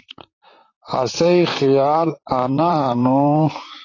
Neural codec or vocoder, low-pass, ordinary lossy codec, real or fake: vocoder, 44.1 kHz, 128 mel bands, Pupu-Vocoder; 7.2 kHz; AAC, 32 kbps; fake